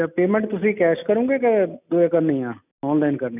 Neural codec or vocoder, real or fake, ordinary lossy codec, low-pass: none; real; none; 3.6 kHz